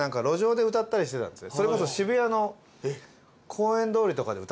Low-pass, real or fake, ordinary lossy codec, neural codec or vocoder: none; real; none; none